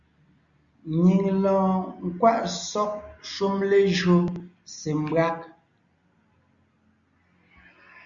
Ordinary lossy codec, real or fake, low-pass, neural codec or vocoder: Opus, 64 kbps; real; 7.2 kHz; none